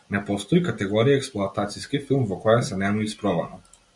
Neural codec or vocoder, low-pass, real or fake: none; 10.8 kHz; real